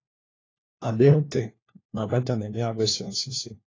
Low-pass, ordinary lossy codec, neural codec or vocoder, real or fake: 7.2 kHz; AAC, 32 kbps; codec, 16 kHz, 1 kbps, FunCodec, trained on LibriTTS, 50 frames a second; fake